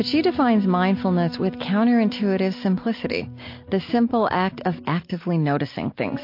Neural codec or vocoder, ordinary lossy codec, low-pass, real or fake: none; MP3, 32 kbps; 5.4 kHz; real